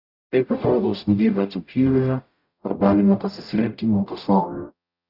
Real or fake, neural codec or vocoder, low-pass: fake; codec, 44.1 kHz, 0.9 kbps, DAC; 5.4 kHz